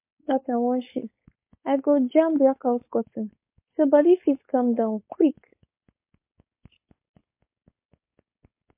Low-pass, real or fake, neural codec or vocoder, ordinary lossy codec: 3.6 kHz; fake; codec, 16 kHz, 4.8 kbps, FACodec; MP3, 24 kbps